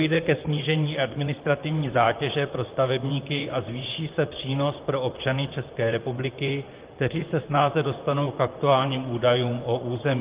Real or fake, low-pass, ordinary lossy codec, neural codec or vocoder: fake; 3.6 kHz; Opus, 32 kbps; vocoder, 44.1 kHz, 128 mel bands, Pupu-Vocoder